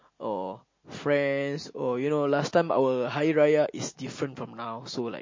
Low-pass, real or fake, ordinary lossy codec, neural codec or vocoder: 7.2 kHz; real; MP3, 32 kbps; none